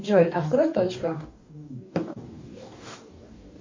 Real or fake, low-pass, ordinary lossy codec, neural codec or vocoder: fake; 7.2 kHz; MP3, 48 kbps; codec, 44.1 kHz, 2.6 kbps, DAC